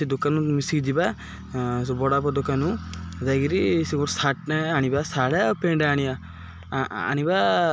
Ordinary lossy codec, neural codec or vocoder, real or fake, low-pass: none; none; real; none